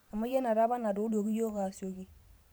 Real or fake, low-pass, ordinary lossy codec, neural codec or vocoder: fake; none; none; vocoder, 44.1 kHz, 128 mel bands every 512 samples, BigVGAN v2